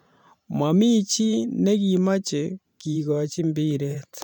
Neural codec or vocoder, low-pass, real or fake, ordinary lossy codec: vocoder, 44.1 kHz, 128 mel bands every 512 samples, BigVGAN v2; 19.8 kHz; fake; none